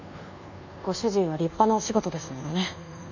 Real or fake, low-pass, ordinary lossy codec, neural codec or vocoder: fake; 7.2 kHz; none; codec, 24 kHz, 1.2 kbps, DualCodec